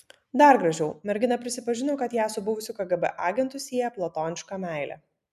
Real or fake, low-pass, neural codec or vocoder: real; 14.4 kHz; none